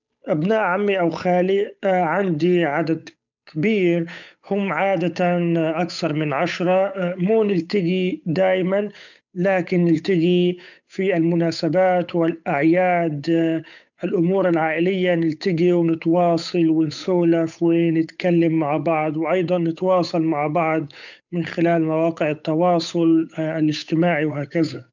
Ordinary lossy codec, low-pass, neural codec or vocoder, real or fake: none; 7.2 kHz; codec, 16 kHz, 8 kbps, FunCodec, trained on Chinese and English, 25 frames a second; fake